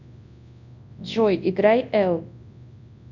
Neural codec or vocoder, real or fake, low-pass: codec, 24 kHz, 0.9 kbps, WavTokenizer, large speech release; fake; 7.2 kHz